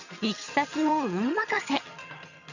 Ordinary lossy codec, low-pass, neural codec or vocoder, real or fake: none; 7.2 kHz; vocoder, 22.05 kHz, 80 mel bands, HiFi-GAN; fake